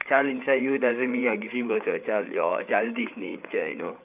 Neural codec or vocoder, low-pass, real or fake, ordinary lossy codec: codec, 16 kHz, 8 kbps, FreqCodec, larger model; 3.6 kHz; fake; none